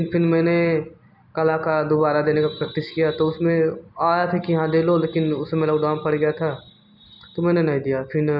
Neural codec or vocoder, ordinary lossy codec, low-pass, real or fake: none; none; 5.4 kHz; real